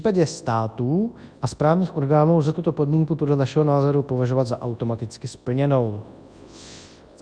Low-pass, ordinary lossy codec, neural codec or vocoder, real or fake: 9.9 kHz; Opus, 64 kbps; codec, 24 kHz, 0.9 kbps, WavTokenizer, large speech release; fake